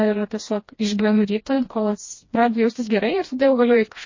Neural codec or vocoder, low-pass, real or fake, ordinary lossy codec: codec, 16 kHz, 1 kbps, FreqCodec, smaller model; 7.2 kHz; fake; MP3, 32 kbps